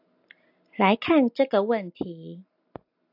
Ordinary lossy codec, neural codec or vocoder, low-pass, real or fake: AAC, 48 kbps; none; 5.4 kHz; real